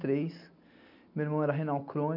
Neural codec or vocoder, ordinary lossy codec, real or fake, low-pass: none; none; real; 5.4 kHz